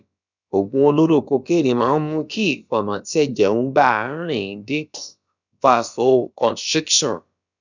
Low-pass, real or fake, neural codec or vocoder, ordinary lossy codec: 7.2 kHz; fake; codec, 16 kHz, about 1 kbps, DyCAST, with the encoder's durations; none